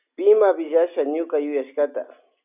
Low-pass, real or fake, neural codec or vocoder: 3.6 kHz; real; none